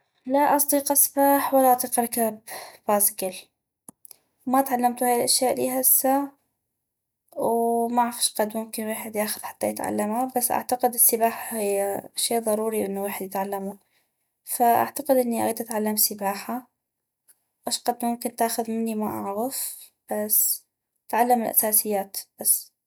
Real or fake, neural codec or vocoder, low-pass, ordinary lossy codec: real; none; none; none